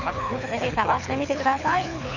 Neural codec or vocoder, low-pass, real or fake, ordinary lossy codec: codec, 24 kHz, 6 kbps, HILCodec; 7.2 kHz; fake; none